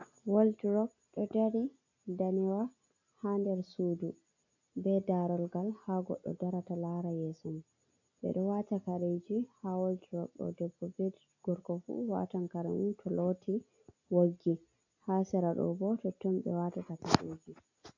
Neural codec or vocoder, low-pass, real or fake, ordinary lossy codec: none; 7.2 kHz; real; AAC, 48 kbps